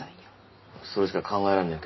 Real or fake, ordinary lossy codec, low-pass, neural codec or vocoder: real; MP3, 24 kbps; 7.2 kHz; none